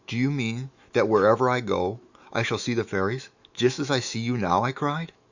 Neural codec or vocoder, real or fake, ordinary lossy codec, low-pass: autoencoder, 48 kHz, 128 numbers a frame, DAC-VAE, trained on Japanese speech; fake; Opus, 64 kbps; 7.2 kHz